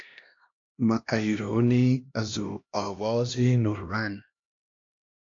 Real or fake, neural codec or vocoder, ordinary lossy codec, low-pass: fake; codec, 16 kHz, 1 kbps, X-Codec, HuBERT features, trained on LibriSpeech; AAC, 48 kbps; 7.2 kHz